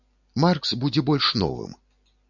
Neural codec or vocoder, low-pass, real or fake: none; 7.2 kHz; real